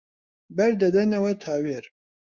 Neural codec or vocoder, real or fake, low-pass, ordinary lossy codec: codec, 16 kHz, 6 kbps, DAC; fake; 7.2 kHz; Opus, 64 kbps